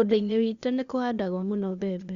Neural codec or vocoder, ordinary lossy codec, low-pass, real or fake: codec, 16 kHz, 0.8 kbps, ZipCodec; Opus, 64 kbps; 7.2 kHz; fake